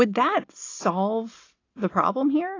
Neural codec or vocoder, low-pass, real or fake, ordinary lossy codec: none; 7.2 kHz; real; AAC, 32 kbps